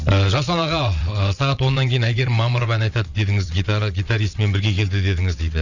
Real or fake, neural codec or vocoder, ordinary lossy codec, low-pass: fake; vocoder, 22.05 kHz, 80 mel bands, WaveNeXt; none; 7.2 kHz